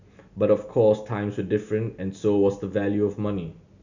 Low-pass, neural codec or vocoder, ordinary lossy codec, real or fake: 7.2 kHz; none; none; real